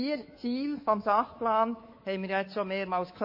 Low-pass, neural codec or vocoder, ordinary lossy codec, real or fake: 5.4 kHz; codec, 24 kHz, 3.1 kbps, DualCodec; MP3, 24 kbps; fake